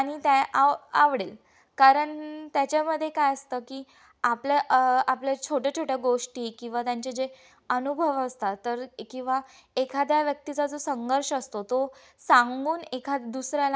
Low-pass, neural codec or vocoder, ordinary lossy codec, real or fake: none; none; none; real